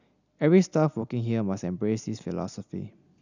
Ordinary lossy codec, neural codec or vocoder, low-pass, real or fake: none; none; 7.2 kHz; real